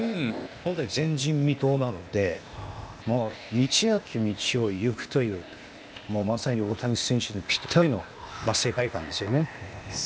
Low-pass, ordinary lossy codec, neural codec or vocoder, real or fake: none; none; codec, 16 kHz, 0.8 kbps, ZipCodec; fake